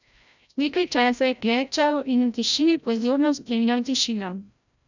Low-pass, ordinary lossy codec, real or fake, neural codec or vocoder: 7.2 kHz; none; fake; codec, 16 kHz, 0.5 kbps, FreqCodec, larger model